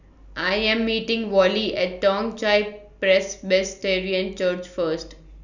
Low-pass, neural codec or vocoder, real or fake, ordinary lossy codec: 7.2 kHz; none; real; none